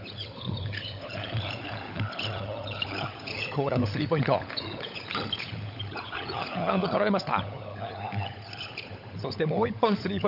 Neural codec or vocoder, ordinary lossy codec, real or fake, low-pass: codec, 16 kHz, 16 kbps, FunCodec, trained on LibriTTS, 50 frames a second; none; fake; 5.4 kHz